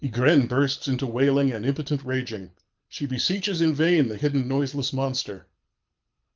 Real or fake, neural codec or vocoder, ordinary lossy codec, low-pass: fake; codec, 24 kHz, 6 kbps, HILCodec; Opus, 24 kbps; 7.2 kHz